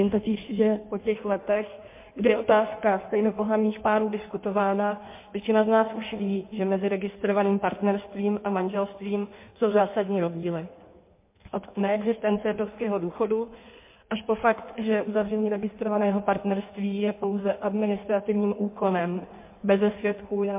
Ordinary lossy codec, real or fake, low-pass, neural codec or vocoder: MP3, 24 kbps; fake; 3.6 kHz; codec, 16 kHz in and 24 kHz out, 1.1 kbps, FireRedTTS-2 codec